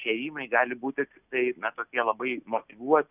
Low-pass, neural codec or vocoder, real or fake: 3.6 kHz; none; real